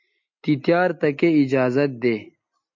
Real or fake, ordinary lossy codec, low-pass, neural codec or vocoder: real; MP3, 48 kbps; 7.2 kHz; none